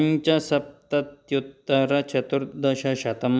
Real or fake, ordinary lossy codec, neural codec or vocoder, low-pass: real; none; none; none